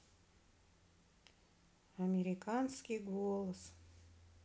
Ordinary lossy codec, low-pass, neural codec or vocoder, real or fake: none; none; none; real